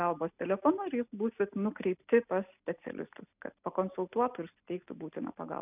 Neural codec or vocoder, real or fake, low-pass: none; real; 3.6 kHz